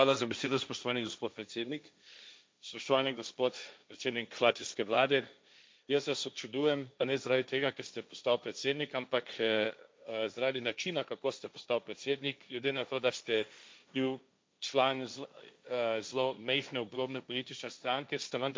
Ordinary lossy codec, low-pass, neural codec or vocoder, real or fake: none; none; codec, 16 kHz, 1.1 kbps, Voila-Tokenizer; fake